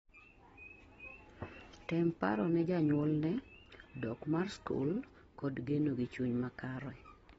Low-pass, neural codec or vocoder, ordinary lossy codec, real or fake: 19.8 kHz; none; AAC, 24 kbps; real